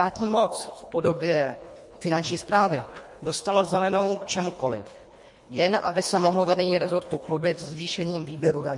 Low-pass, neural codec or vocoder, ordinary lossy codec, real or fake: 10.8 kHz; codec, 24 kHz, 1.5 kbps, HILCodec; MP3, 48 kbps; fake